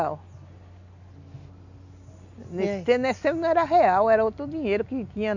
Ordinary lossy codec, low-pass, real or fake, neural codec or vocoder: none; 7.2 kHz; real; none